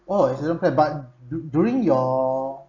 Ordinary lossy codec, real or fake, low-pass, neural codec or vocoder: none; real; 7.2 kHz; none